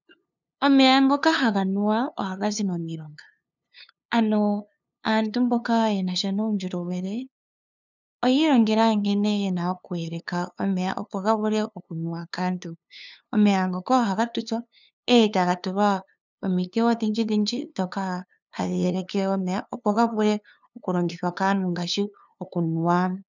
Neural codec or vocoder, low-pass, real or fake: codec, 16 kHz, 2 kbps, FunCodec, trained on LibriTTS, 25 frames a second; 7.2 kHz; fake